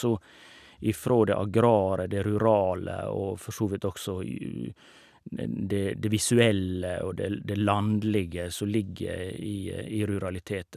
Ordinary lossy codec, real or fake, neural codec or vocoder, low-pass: none; real; none; 14.4 kHz